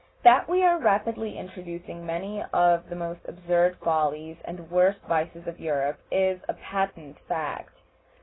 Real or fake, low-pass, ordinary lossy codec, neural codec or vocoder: real; 7.2 kHz; AAC, 16 kbps; none